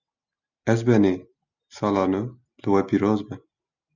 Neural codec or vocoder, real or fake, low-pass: none; real; 7.2 kHz